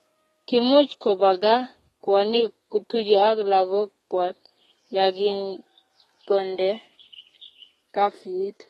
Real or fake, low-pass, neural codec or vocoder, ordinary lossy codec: fake; 14.4 kHz; codec, 32 kHz, 1.9 kbps, SNAC; AAC, 32 kbps